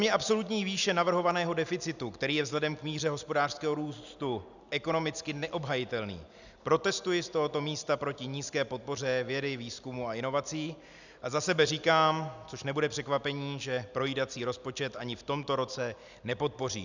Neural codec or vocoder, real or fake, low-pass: none; real; 7.2 kHz